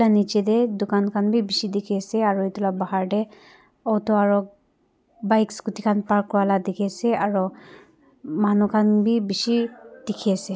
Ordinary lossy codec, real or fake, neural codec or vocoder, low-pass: none; real; none; none